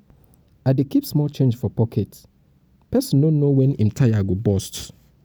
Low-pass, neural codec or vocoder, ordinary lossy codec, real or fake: none; none; none; real